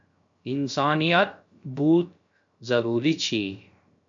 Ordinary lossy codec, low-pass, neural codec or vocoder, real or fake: MP3, 48 kbps; 7.2 kHz; codec, 16 kHz, 0.3 kbps, FocalCodec; fake